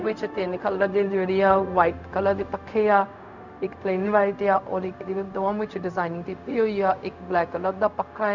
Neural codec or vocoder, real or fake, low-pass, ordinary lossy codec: codec, 16 kHz, 0.4 kbps, LongCat-Audio-Codec; fake; 7.2 kHz; none